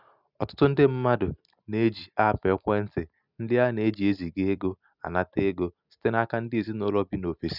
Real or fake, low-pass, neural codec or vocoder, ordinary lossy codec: real; 5.4 kHz; none; none